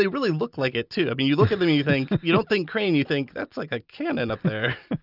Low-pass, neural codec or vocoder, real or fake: 5.4 kHz; none; real